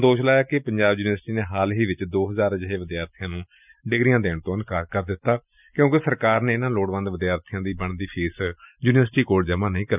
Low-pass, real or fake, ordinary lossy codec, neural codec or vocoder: 3.6 kHz; real; none; none